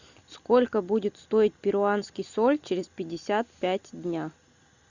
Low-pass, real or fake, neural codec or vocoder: 7.2 kHz; real; none